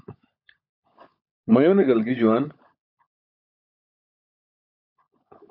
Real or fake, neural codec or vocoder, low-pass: fake; codec, 16 kHz, 16 kbps, FunCodec, trained on LibriTTS, 50 frames a second; 5.4 kHz